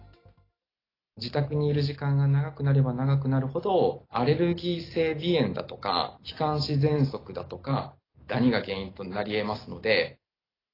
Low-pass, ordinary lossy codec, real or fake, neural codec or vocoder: 5.4 kHz; AAC, 24 kbps; real; none